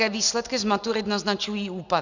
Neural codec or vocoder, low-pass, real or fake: none; 7.2 kHz; real